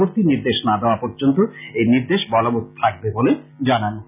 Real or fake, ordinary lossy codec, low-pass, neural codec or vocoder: real; none; 3.6 kHz; none